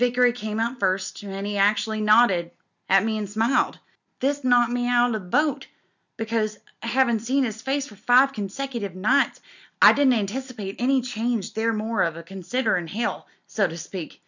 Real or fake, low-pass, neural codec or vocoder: real; 7.2 kHz; none